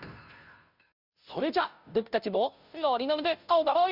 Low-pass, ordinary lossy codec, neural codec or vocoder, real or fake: 5.4 kHz; none; codec, 16 kHz, 0.5 kbps, FunCodec, trained on Chinese and English, 25 frames a second; fake